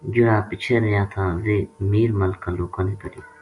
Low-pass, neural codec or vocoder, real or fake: 10.8 kHz; none; real